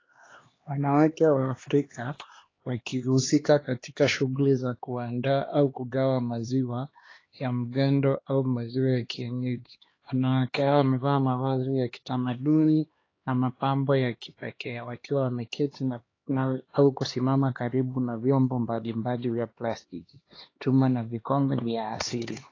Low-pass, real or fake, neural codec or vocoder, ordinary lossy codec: 7.2 kHz; fake; codec, 16 kHz, 2 kbps, X-Codec, HuBERT features, trained on LibriSpeech; AAC, 32 kbps